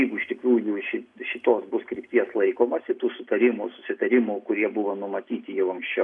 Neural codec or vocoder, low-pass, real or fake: none; 10.8 kHz; real